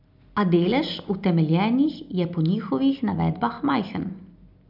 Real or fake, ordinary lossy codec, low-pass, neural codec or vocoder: real; none; 5.4 kHz; none